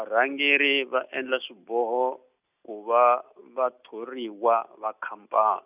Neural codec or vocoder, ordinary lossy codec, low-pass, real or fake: none; none; 3.6 kHz; real